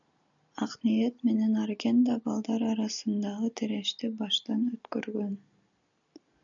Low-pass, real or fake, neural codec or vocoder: 7.2 kHz; real; none